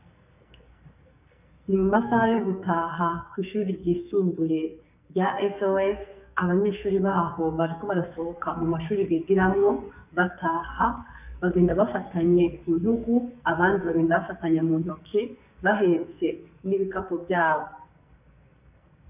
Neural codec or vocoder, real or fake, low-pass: codec, 44.1 kHz, 2.6 kbps, SNAC; fake; 3.6 kHz